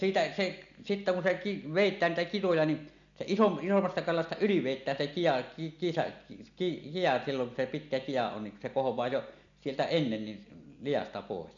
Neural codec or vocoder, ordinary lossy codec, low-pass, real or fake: none; none; 7.2 kHz; real